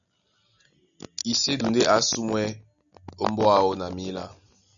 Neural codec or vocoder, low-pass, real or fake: none; 7.2 kHz; real